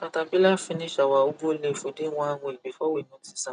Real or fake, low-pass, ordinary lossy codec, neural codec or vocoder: real; 9.9 kHz; none; none